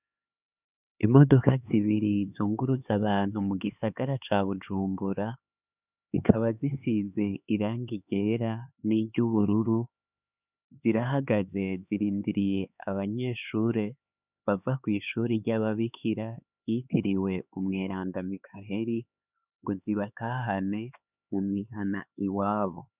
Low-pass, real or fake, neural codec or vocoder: 3.6 kHz; fake; codec, 16 kHz, 4 kbps, X-Codec, HuBERT features, trained on LibriSpeech